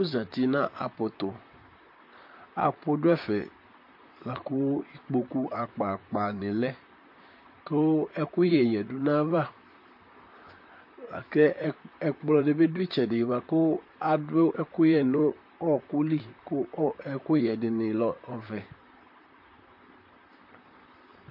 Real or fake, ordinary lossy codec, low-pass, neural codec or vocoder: fake; MP3, 32 kbps; 5.4 kHz; vocoder, 44.1 kHz, 128 mel bands, Pupu-Vocoder